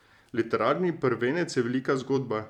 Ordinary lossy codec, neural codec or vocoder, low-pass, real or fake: none; vocoder, 44.1 kHz, 128 mel bands every 256 samples, BigVGAN v2; 19.8 kHz; fake